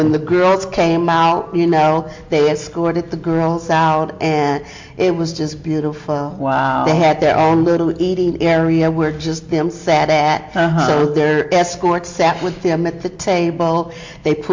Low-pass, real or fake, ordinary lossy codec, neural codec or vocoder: 7.2 kHz; real; MP3, 48 kbps; none